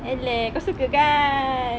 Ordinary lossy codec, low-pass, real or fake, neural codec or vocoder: none; none; real; none